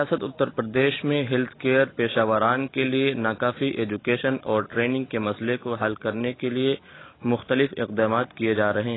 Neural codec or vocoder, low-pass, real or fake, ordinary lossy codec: none; 7.2 kHz; real; AAC, 16 kbps